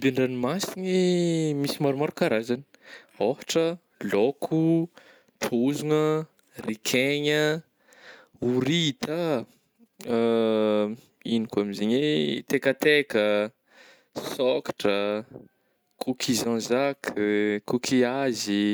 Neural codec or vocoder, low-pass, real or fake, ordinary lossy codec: none; none; real; none